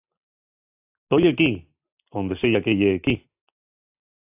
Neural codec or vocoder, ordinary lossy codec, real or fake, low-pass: none; AAC, 32 kbps; real; 3.6 kHz